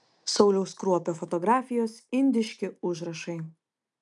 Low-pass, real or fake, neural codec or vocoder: 10.8 kHz; real; none